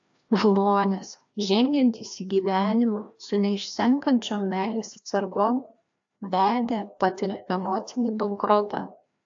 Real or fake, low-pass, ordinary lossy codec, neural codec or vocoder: fake; 7.2 kHz; AAC, 64 kbps; codec, 16 kHz, 1 kbps, FreqCodec, larger model